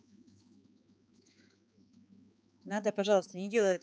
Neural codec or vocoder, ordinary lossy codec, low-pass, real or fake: codec, 16 kHz, 4 kbps, X-Codec, HuBERT features, trained on balanced general audio; none; none; fake